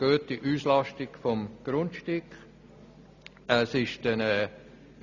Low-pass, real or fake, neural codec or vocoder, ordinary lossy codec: 7.2 kHz; real; none; none